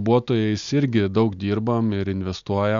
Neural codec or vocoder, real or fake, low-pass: none; real; 7.2 kHz